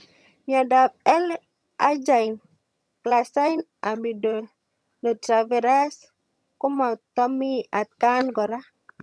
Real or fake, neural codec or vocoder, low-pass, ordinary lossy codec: fake; vocoder, 22.05 kHz, 80 mel bands, HiFi-GAN; none; none